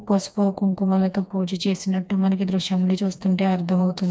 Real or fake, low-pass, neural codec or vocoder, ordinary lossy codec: fake; none; codec, 16 kHz, 2 kbps, FreqCodec, smaller model; none